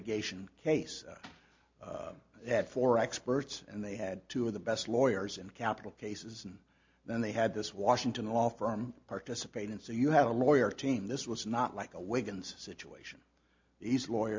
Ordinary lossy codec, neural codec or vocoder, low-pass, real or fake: MP3, 48 kbps; none; 7.2 kHz; real